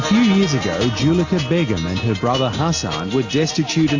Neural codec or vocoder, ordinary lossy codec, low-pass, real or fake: none; AAC, 48 kbps; 7.2 kHz; real